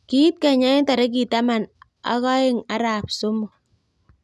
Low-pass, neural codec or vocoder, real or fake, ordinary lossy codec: none; none; real; none